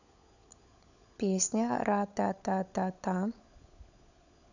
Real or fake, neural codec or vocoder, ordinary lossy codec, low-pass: fake; codec, 16 kHz, 16 kbps, FunCodec, trained on LibriTTS, 50 frames a second; none; 7.2 kHz